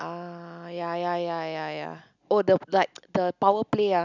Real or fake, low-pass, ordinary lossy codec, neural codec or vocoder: real; 7.2 kHz; none; none